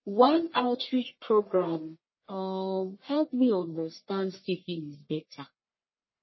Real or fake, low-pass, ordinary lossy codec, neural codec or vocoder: fake; 7.2 kHz; MP3, 24 kbps; codec, 44.1 kHz, 1.7 kbps, Pupu-Codec